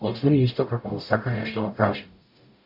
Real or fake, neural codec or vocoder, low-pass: fake; codec, 44.1 kHz, 0.9 kbps, DAC; 5.4 kHz